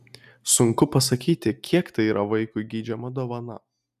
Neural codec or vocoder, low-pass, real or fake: none; 14.4 kHz; real